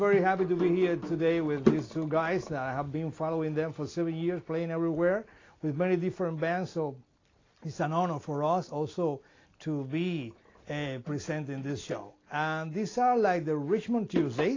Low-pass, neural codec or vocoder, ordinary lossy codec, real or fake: 7.2 kHz; none; AAC, 32 kbps; real